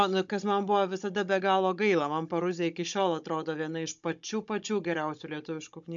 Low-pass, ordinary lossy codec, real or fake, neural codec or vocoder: 7.2 kHz; MP3, 64 kbps; fake; codec, 16 kHz, 16 kbps, FunCodec, trained on Chinese and English, 50 frames a second